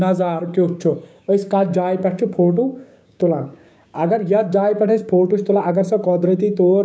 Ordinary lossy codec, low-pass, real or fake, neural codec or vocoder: none; none; fake; codec, 16 kHz, 6 kbps, DAC